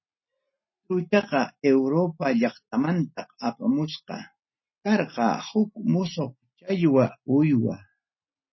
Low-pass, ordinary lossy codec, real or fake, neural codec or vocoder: 7.2 kHz; MP3, 24 kbps; real; none